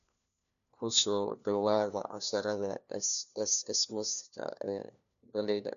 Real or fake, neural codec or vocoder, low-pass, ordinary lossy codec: fake; codec, 16 kHz, 1 kbps, FunCodec, trained on LibriTTS, 50 frames a second; 7.2 kHz; none